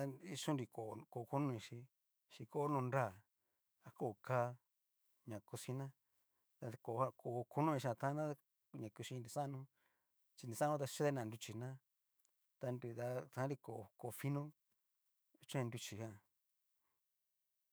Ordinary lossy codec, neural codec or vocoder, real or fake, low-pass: none; autoencoder, 48 kHz, 128 numbers a frame, DAC-VAE, trained on Japanese speech; fake; none